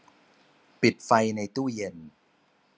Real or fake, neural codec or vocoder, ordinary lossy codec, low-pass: real; none; none; none